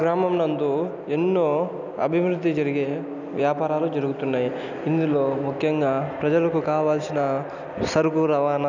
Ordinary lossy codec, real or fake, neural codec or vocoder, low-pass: none; real; none; 7.2 kHz